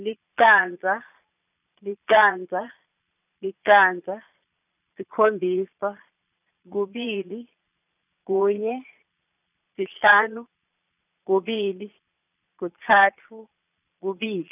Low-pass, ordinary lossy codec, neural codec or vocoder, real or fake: 3.6 kHz; none; vocoder, 22.05 kHz, 80 mel bands, Vocos; fake